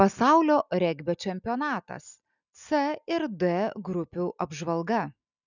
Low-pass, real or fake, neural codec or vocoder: 7.2 kHz; real; none